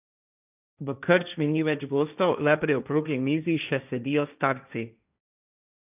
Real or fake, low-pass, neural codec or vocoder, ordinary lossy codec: fake; 3.6 kHz; codec, 16 kHz, 1.1 kbps, Voila-Tokenizer; none